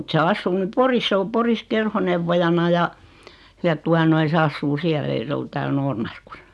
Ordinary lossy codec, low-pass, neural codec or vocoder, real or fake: none; none; none; real